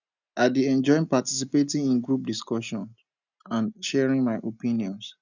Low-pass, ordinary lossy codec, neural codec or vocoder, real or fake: 7.2 kHz; none; none; real